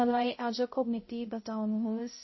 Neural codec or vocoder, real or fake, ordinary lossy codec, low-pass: codec, 16 kHz, 0.5 kbps, X-Codec, HuBERT features, trained on balanced general audio; fake; MP3, 24 kbps; 7.2 kHz